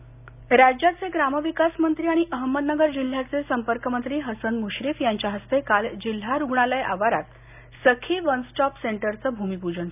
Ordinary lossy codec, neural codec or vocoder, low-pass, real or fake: none; none; 3.6 kHz; real